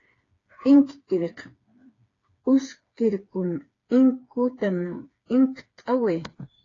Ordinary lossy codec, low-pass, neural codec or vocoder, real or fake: AAC, 32 kbps; 7.2 kHz; codec, 16 kHz, 4 kbps, FreqCodec, smaller model; fake